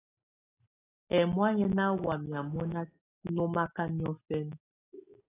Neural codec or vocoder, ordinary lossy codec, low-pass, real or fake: none; AAC, 16 kbps; 3.6 kHz; real